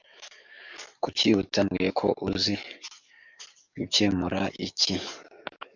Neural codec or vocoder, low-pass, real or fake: codec, 44.1 kHz, 7.8 kbps, DAC; 7.2 kHz; fake